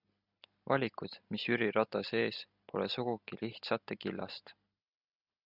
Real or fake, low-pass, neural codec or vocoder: real; 5.4 kHz; none